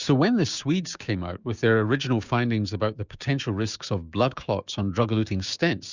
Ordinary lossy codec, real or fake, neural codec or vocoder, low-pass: Opus, 64 kbps; fake; codec, 16 kHz, 6 kbps, DAC; 7.2 kHz